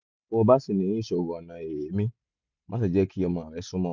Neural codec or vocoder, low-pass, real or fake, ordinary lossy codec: none; 7.2 kHz; real; none